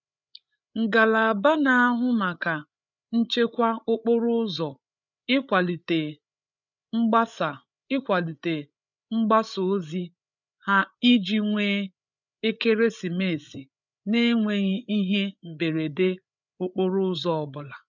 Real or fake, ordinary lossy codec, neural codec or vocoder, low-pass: fake; none; codec, 16 kHz, 8 kbps, FreqCodec, larger model; 7.2 kHz